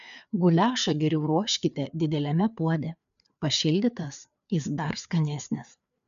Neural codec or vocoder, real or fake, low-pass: codec, 16 kHz, 4 kbps, FreqCodec, larger model; fake; 7.2 kHz